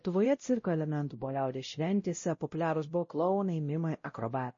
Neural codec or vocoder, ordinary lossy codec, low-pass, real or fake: codec, 16 kHz, 0.5 kbps, X-Codec, WavLM features, trained on Multilingual LibriSpeech; MP3, 32 kbps; 7.2 kHz; fake